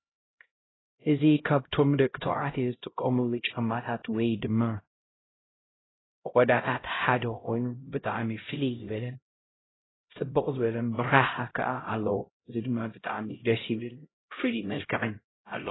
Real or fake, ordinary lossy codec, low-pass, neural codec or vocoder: fake; AAC, 16 kbps; 7.2 kHz; codec, 16 kHz, 0.5 kbps, X-Codec, HuBERT features, trained on LibriSpeech